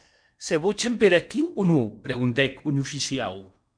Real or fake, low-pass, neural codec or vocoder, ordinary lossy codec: fake; 9.9 kHz; codec, 16 kHz in and 24 kHz out, 0.8 kbps, FocalCodec, streaming, 65536 codes; MP3, 96 kbps